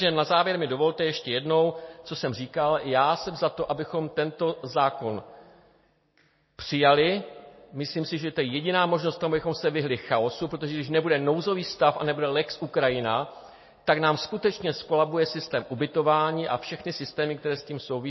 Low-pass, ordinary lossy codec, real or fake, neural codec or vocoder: 7.2 kHz; MP3, 24 kbps; real; none